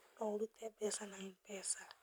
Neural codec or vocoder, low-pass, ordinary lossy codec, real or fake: vocoder, 44.1 kHz, 128 mel bands every 512 samples, BigVGAN v2; none; none; fake